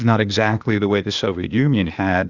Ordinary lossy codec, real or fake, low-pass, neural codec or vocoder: Opus, 64 kbps; fake; 7.2 kHz; codec, 16 kHz, 0.8 kbps, ZipCodec